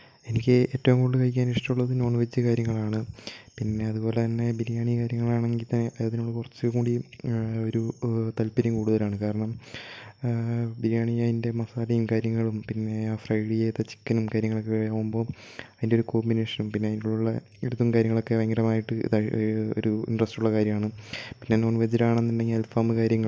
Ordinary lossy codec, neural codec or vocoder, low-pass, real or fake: none; none; none; real